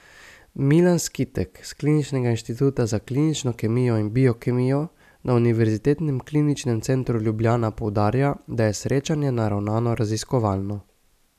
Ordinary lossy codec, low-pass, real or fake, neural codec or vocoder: none; 14.4 kHz; real; none